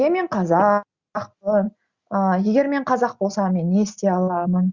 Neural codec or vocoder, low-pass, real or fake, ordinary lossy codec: none; 7.2 kHz; real; Opus, 64 kbps